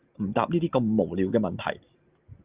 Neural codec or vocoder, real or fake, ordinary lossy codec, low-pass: none; real; Opus, 24 kbps; 3.6 kHz